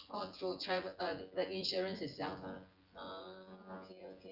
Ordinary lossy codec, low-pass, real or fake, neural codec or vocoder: Opus, 32 kbps; 5.4 kHz; fake; vocoder, 24 kHz, 100 mel bands, Vocos